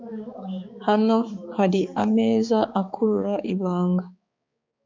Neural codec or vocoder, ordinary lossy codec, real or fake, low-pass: codec, 16 kHz, 4 kbps, X-Codec, HuBERT features, trained on balanced general audio; MP3, 64 kbps; fake; 7.2 kHz